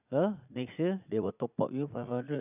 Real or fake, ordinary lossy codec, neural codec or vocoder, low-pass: fake; none; vocoder, 44.1 kHz, 80 mel bands, Vocos; 3.6 kHz